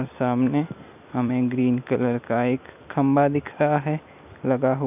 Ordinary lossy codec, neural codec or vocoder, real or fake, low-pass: none; none; real; 3.6 kHz